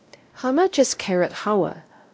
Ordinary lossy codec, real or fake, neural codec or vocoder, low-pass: none; fake; codec, 16 kHz, 0.5 kbps, X-Codec, WavLM features, trained on Multilingual LibriSpeech; none